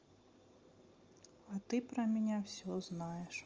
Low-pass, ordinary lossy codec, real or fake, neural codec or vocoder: 7.2 kHz; Opus, 24 kbps; real; none